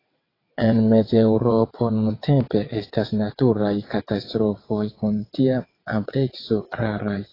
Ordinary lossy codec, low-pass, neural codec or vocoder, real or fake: AAC, 24 kbps; 5.4 kHz; vocoder, 22.05 kHz, 80 mel bands, WaveNeXt; fake